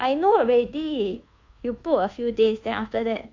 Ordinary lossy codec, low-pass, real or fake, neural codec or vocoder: AAC, 48 kbps; 7.2 kHz; fake; codec, 24 kHz, 1.2 kbps, DualCodec